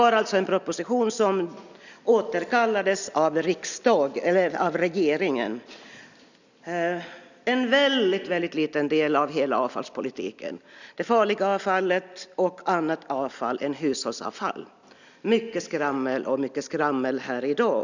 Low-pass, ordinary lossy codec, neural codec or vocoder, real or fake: 7.2 kHz; none; none; real